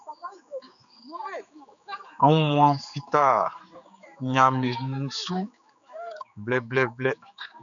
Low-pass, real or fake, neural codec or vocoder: 7.2 kHz; fake; codec, 16 kHz, 4 kbps, X-Codec, HuBERT features, trained on general audio